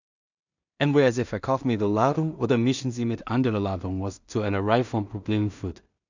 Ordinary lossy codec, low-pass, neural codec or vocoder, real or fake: none; 7.2 kHz; codec, 16 kHz in and 24 kHz out, 0.4 kbps, LongCat-Audio-Codec, two codebook decoder; fake